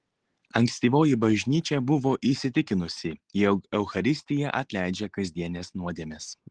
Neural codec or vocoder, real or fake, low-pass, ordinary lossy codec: none; real; 9.9 kHz; Opus, 16 kbps